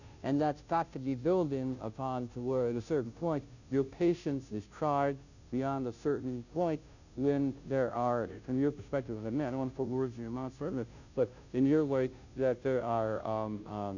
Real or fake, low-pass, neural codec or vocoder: fake; 7.2 kHz; codec, 16 kHz, 0.5 kbps, FunCodec, trained on Chinese and English, 25 frames a second